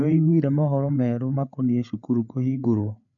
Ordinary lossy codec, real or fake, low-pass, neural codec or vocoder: none; fake; 7.2 kHz; codec, 16 kHz, 8 kbps, FreqCodec, larger model